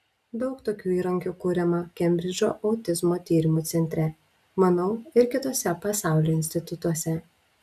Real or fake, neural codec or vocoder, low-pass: real; none; 14.4 kHz